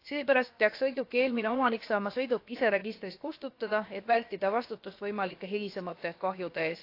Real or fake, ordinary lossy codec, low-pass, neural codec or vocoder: fake; AAC, 32 kbps; 5.4 kHz; codec, 16 kHz, about 1 kbps, DyCAST, with the encoder's durations